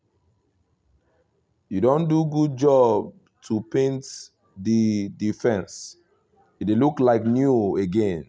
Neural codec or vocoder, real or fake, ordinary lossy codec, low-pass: none; real; none; none